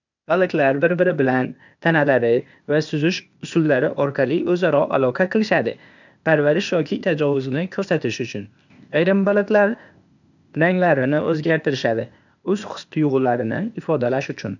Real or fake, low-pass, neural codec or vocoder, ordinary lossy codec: fake; 7.2 kHz; codec, 16 kHz, 0.8 kbps, ZipCodec; none